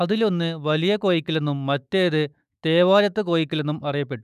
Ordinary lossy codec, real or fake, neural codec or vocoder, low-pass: none; fake; autoencoder, 48 kHz, 128 numbers a frame, DAC-VAE, trained on Japanese speech; 14.4 kHz